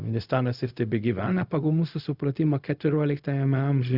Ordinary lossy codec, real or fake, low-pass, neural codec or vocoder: Opus, 64 kbps; fake; 5.4 kHz; codec, 16 kHz, 0.4 kbps, LongCat-Audio-Codec